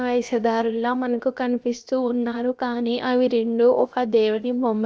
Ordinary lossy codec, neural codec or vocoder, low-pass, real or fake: none; codec, 16 kHz, 0.7 kbps, FocalCodec; none; fake